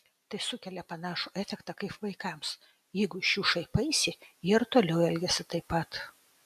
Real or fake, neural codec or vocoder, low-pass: real; none; 14.4 kHz